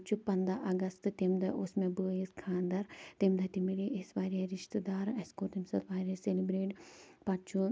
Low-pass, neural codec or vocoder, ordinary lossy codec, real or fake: none; none; none; real